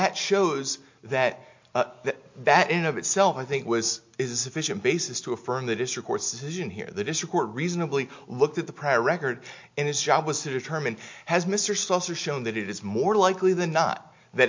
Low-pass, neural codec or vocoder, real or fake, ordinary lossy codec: 7.2 kHz; none; real; MP3, 48 kbps